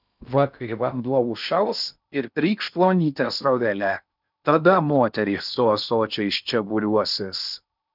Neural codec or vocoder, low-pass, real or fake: codec, 16 kHz in and 24 kHz out, 0.6 kbps, FocalCodec, streaming, 2048 codes; 5.4 kHz; fake